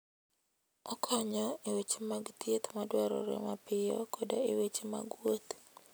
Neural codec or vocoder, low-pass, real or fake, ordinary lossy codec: none; none; real; none